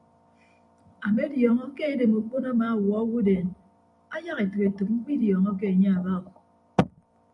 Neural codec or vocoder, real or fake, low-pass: vocoder, 44.1 kHz, 128 mel bands every 256 samples, BigVGAN v2; fake; 10.8 kHz